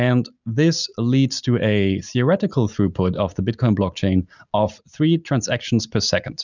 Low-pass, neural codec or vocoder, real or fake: 7.2 kHz; none; real